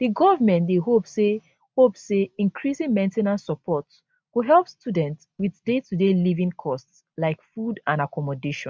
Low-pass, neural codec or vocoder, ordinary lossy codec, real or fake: none; none; none; real